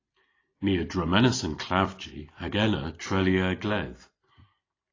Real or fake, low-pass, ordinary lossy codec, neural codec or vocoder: real; 7.2 kHz; AAC, 32 kbps; none